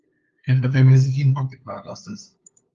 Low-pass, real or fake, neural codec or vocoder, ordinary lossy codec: 7.2 kHz; fake; codec, 16 kHz, 2 kbps, FunCodec, trained on LibriTTS, 25 frames a second; Opus, 32 kbps